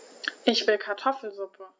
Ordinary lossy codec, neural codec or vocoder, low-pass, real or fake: none; none; none; real